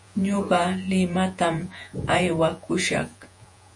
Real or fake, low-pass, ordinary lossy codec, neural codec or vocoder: fake; 10.8 kHz; MP3, 48 kbps; vocoder, 48 kHz, 128 mel bands, Vocos